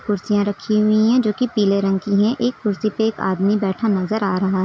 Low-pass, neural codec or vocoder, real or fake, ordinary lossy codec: none; none; real; none